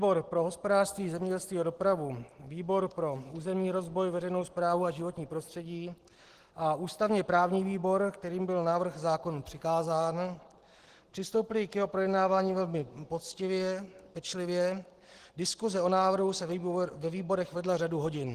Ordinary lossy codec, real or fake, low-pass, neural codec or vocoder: Opus, 16 kbps; real; 14.4 kHz; none